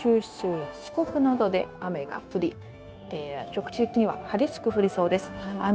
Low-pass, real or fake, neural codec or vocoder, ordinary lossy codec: none; fake; codec, 16 kHz, 0.9 kbps, LongCat-Audio-Codec; none